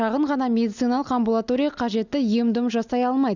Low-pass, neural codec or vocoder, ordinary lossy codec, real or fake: 7.2 kHz; none; none; real